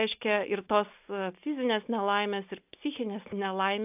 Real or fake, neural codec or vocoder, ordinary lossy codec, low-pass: real; none; AAC, 32 kbps; 3.6 kHz